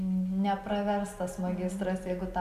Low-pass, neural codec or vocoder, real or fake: 14.4 kHz; none; real